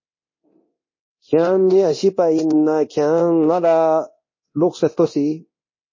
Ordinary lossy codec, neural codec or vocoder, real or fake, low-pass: MP3, 32 kbps; codec, 24 kHz, 0.9 kbps, DualCodec; fake; 7.2 kHz